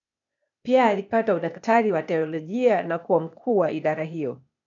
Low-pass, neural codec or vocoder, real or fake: 7.2 kHz; codec, 16 kHz, 0.8 kbps, ZipCodec; fake